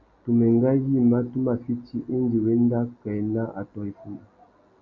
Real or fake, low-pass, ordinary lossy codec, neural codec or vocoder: real; 7.2 kHz; MP3, 64 kbps; none